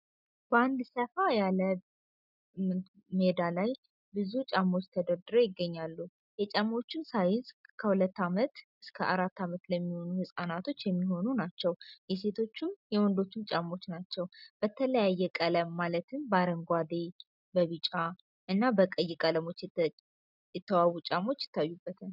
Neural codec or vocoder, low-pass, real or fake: none; 5.4 kHz; real